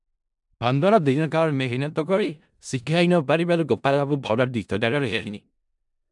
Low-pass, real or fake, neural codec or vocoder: 10.8 kHz; fake; codec, 16 kHz in and 24 kHz out, 0.4 kbps, LongCat-Audio-Codec, four codebook decoder